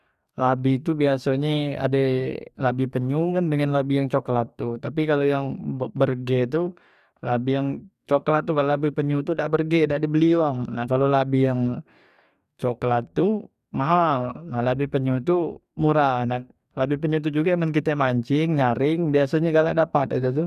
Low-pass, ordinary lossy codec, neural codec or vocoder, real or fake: 14.4 kHz; none; codec, 44.1 kHz, 2.6 kbps, DAC; fake